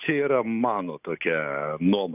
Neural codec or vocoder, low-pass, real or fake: none; 3.6 kHz; real